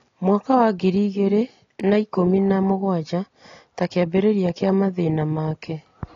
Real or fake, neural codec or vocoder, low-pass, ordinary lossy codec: real; none; 7.2 kHz; AAC, 32 kbps